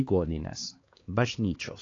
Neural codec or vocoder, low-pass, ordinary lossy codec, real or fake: codec, 16 kHz, 2 kbps, X-Codec, HuBERT features, trained on LibriSpeech; 7.2 kHz; AAC, 32 kbps; fake